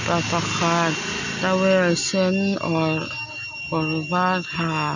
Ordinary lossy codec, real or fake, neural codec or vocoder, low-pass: none; real; none; 7.2 kHz